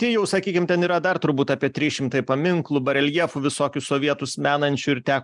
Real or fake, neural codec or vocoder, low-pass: real; none; 10.8 kHz